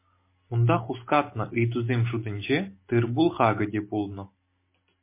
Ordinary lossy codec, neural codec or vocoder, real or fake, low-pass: MP3, 24 kbps; none; real; 3.6 kHz